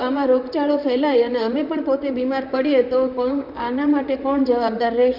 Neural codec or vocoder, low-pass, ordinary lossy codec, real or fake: vocoder, 44.1 kHz, 128 mel bands, Pupu-Vocoder; 5.4 kHz; none; fake